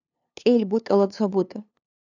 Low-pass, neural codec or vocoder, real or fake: 7.2 kHz; codec, 16 kHz, 2 kbps, FunCodec, trained on LibriTTS, 25 frames a second; fake